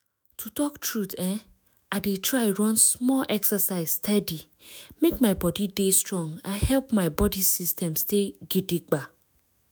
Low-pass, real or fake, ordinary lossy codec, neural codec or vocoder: none; fake; none; autoencoder, 48 kHz, 128 numbers a frame, DAC-VAE, trained on Japanese speech